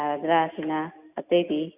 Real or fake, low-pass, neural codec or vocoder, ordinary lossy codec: real; 3.6 kHz; none; none